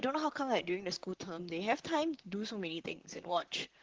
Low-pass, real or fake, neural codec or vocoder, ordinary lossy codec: 7.2 kHz; fake; vocoder, 44.1 kHz, 128 mel bands, Pupu-Vocoder; Opus, 16 kbps